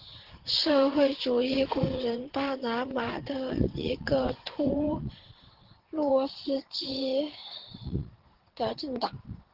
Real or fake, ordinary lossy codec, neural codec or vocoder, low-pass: fake; Opus, 16 kbps; vocoder, 22.05 kHz, 80 mel bands, WaveNeXt; 5.4 kHz